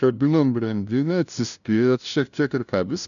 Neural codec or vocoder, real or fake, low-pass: codec, 16 kHz, 0.5 kbps, FunCodec, trained on LibriTTS, 25 frames a second; fake; 7.2 kHz